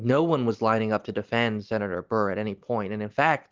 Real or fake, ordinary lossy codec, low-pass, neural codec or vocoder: real; Opus, 24 kbps; 7.2 kHz; none